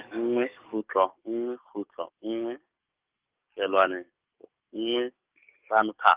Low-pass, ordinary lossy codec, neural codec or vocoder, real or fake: 3.6 kHz; Opus, 24 kbps; codec, 44.1 kHz, 7.8 kbps, DAC; fake